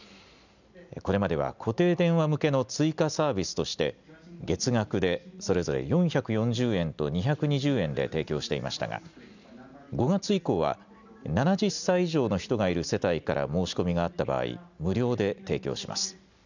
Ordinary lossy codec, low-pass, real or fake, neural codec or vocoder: none; 7.2 kHz; real; none